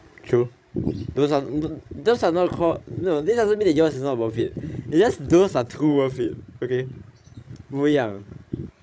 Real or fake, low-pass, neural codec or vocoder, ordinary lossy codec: fake; none; codec, 16 kHz, 16 kbps, FreqCodec, larger model; none